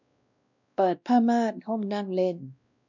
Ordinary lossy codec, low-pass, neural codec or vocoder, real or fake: none; 7.2 kHz; codec, 16 kHz, 1 kbps, X-Codec, WavLM features, trained on Multilingual LibriSpeech; fake